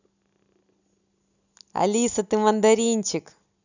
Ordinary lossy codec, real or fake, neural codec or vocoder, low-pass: none; real; none; 7.2 kHz